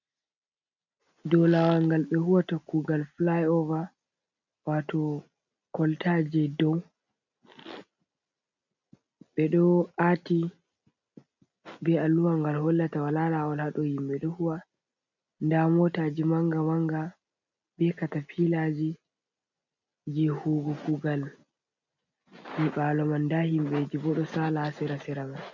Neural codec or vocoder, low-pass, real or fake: none; 7.2 kHz; real